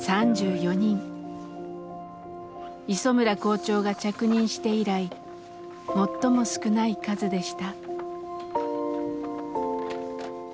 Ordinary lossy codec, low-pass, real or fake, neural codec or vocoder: none; none; real; none